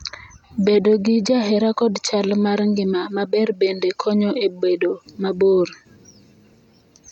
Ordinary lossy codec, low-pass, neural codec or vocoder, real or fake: none; 19.8 kHz; none; real